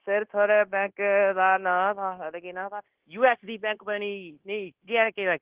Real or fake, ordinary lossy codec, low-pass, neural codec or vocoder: fake; Opus, 16 kbps; 3.6 kHz; codec, 16 kHz, 0.9 kbps, LongCat-Audio-Codec